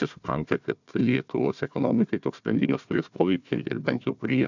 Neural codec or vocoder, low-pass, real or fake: codec, 16 kHz, 1 kbps, FunCodec, trained on Chinese and English, 50 frames a second; 7.2 kHz; fake